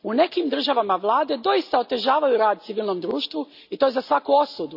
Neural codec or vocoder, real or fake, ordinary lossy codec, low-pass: none; real; none; 5.4 kHz